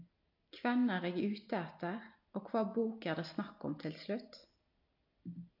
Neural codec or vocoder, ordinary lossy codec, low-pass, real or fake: none; AAC, 48 kbps; 5.4 kHz; real